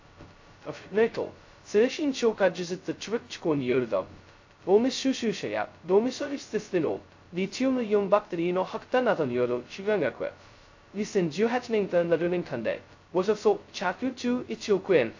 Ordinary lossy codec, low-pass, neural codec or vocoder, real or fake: AAC, 48 kbps; 7.2 kHz; codec, 16 kHz, 0.2 kbps, FocalCodec; fake